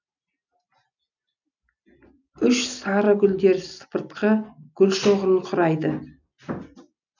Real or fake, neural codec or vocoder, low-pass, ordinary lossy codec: real; none; 7.2 kHz; none